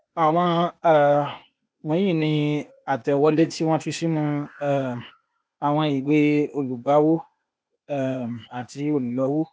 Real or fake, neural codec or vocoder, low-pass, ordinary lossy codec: fake; codec, 16 kHz, 0.8 kbps, ZipCodec; none; none